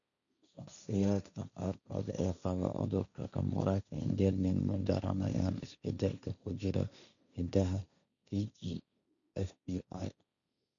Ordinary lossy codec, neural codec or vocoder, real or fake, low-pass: none; codec, 16 kHz, 1.1 kbps, Voila-Tokenizer; fake; 7.2 kHz